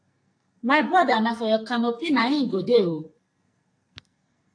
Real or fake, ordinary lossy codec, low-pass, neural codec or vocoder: fake; AAC, 48 kbps; 9.9 kHz; codec, 44.1 kHz, 2.6 kbps, SNAC